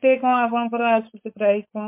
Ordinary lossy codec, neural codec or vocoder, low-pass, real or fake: MP3, 24 kbps; codec, 16 kHz, 4.8 kbps, FACodec; 3.6 kHz; fake